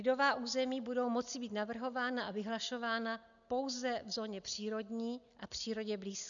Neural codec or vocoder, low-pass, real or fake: none; 7.2 kHz; real